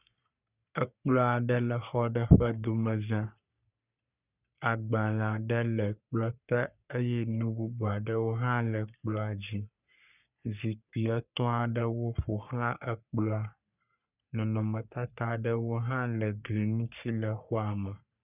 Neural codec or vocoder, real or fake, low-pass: codec, 44.1 kHz, 3.4 kbps, Pupu-Codec; fake; 3.6 kHz